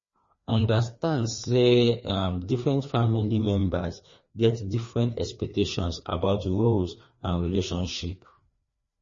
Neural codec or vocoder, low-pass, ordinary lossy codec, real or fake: codec, 16 kHz, 2 kbps, FreqCodec, larger model; 7.2 kHz; MP3, 32 kbps; fake